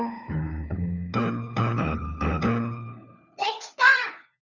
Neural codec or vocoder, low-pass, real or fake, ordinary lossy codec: codec, 16 kHz, 4 kbps, FunCodec, trained on LibriTTS, 50 frames a second; 7.2 kHz; fake; Opus, 64 kbps